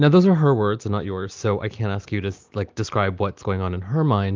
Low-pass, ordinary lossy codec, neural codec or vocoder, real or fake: 7.2 kHz; Opus, 32 kbps; none; real